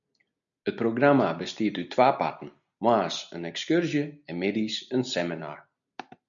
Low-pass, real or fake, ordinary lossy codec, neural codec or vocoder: 7.2 kHz; real; MP3, 96 kbps; none